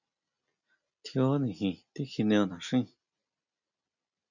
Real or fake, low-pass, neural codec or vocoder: real; 7.2 kHz; none